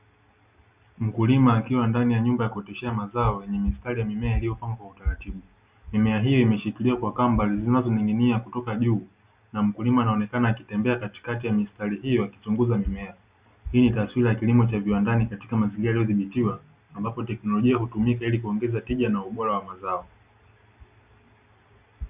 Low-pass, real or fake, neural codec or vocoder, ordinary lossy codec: 3.6 kHz; real; none; Opus, 64 kbps